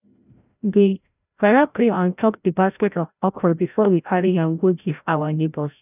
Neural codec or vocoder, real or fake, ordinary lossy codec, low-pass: codec, 16 kHz, 0.5 kbps, FreqCodec, larger model; fake; none; 3.6 kHz